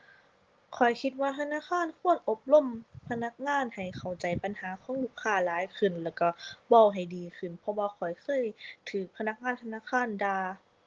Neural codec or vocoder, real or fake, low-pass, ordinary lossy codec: none; real; 7.2 kHz; Opus, 16 kbps